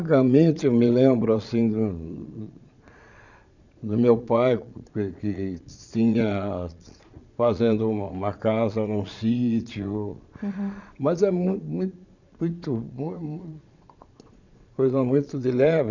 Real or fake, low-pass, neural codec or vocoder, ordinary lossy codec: fake; 7.2 kHz; vocoder, 22.05 kHz, 80 mel bands, Vocos; none